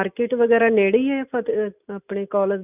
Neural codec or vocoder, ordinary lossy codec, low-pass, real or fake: none; none; 3.6 kHz; real